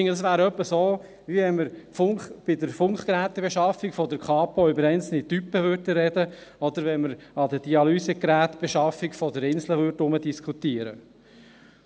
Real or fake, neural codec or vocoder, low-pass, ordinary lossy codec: real; none; none; none